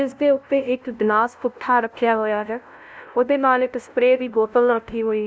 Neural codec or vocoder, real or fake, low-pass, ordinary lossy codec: codec, 16 kHz, 0.5 kbps, FunCodec, trained on LibriTTS, 25 frames a second; fake; none; none